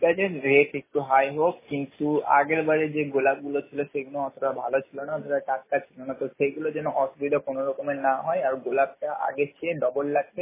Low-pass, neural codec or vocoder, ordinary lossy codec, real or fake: 3.6 kHz; none; MP3, 16 kbps; real